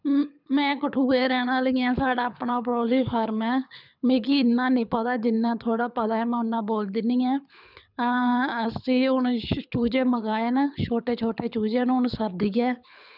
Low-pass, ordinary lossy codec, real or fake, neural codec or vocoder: 5.4 kHz; none; fake; codec, 24 kHz, 6 kbps, HILCodec